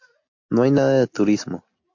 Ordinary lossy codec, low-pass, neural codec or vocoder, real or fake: MP3, 48 kbps; 7.2 kHz; none; real